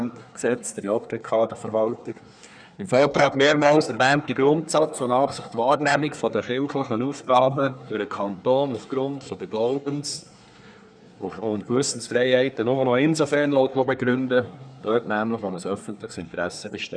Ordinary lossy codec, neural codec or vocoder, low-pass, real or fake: none; codec, 24 kHz, 1 kbps, SNAC; 9.9 kHz; fake